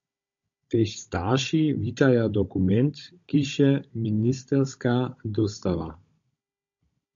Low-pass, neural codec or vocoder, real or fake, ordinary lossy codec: 7.2 kHz; codec, 16 kHz, 16 kbps, FunCodec, trained on Chinese and English, 50 frames a second; fake; MP3, 48 kbps